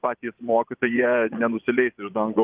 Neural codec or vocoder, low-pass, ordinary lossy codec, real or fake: vocoder, 24 kHz, 100 mel bands, Vocos; 3.6 kHz; Opus, 32 kbps; fake